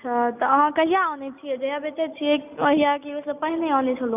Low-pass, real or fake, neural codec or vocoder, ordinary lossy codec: 3.6 kHz; real; none; none